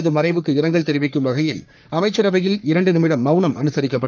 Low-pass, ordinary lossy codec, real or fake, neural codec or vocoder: 7.2 kHz; none; fake; codec, 44.1 kHz, 3.4 kbps, Pupu-Codec